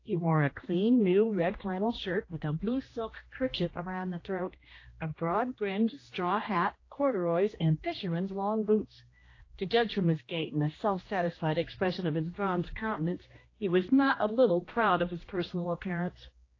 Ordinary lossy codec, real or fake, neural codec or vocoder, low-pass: AAC, 32 kbps; fake; codec, 16 kHz, 1 kbps, X-Codec, HuBERT features, trained on general audio; 7.2 kHz